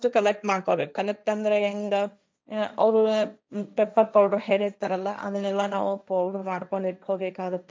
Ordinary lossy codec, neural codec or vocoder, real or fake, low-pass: none; codec, 16 kHz, 1.1 kbps, Voila-Tokenizer; fake; none